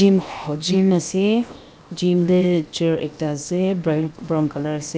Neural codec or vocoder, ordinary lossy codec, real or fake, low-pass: codec, 16 kHz, 0.7 kbps, FocalCodec; none; fake; none